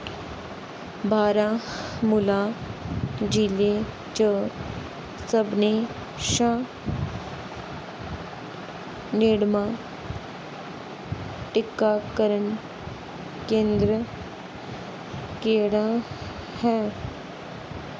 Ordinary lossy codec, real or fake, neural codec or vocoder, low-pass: none; real; none; none